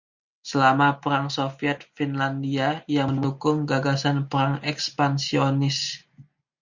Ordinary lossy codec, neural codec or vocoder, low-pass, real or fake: Opus, 64 kbps; none; 7.2 kHz; real